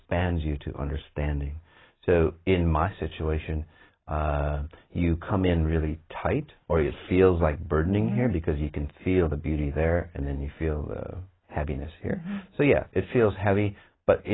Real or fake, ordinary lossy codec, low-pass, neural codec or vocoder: real; AAC, 16 kbps; 7.2 kHz; none